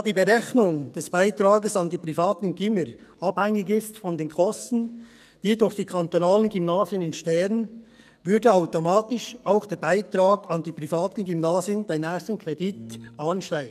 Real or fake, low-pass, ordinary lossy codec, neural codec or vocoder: fake; 14.4 kHz; none; codec, 44.1 kHz, 2.6 kbps, SNAC